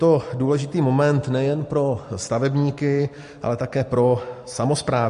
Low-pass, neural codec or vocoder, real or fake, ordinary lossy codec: 10.8 kHz; none; real; MP3, 48 kbps